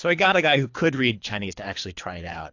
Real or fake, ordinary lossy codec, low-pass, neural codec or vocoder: fake; AAC, 48 kbps; 7.2 kHz; codec, 24 kHz, 3 kbps, HILCodec